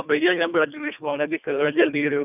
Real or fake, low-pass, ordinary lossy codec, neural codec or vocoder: fake; 3.6 kHz; none; codec, 24 kHz, 1.5 kbps, HILCodec